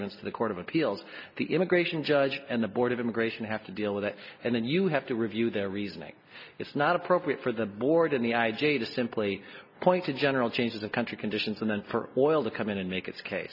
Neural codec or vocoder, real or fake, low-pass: none; real; 5.4 kHz